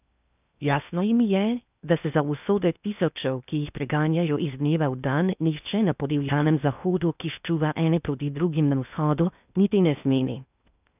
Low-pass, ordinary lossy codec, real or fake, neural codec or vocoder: 3.6 kHz; none; fake; codec, 16 kHz in and 24 kHz out, 0.6 kbps, FocalCodec, streaming, 2048 codes